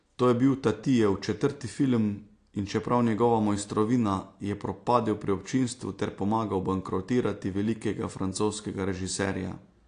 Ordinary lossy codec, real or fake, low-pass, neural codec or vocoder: AAC, 48 kbps; real; 9.9 kHz; none